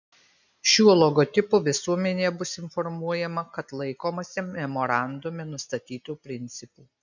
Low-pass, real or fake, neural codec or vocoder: 7.2 kHz; real; none